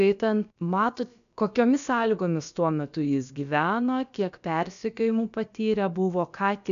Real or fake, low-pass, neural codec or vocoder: fake; 7.2 kHz; codec, 16 kHz, about 1 kbps, DyCAST, with the encoder's durations